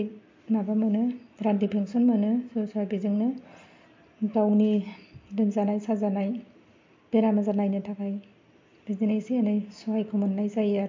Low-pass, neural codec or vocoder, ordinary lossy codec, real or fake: 7.2 kHz; none; MP3, 48 kbps; real